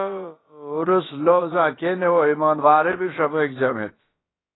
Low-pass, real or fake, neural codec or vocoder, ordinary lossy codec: 7.2 kHz; fake; codec, 16 kHz, about 1 kbps, DyCAST, with the encoder's durations; AAC, 16 kbps